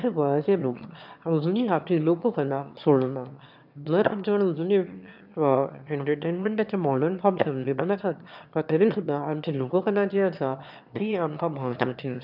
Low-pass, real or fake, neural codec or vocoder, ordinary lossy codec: 5.4 kHz; fake; autoencoder, 22.05 kHz, a latent of 192 numbers a frame, VITS, trained on one speaker; none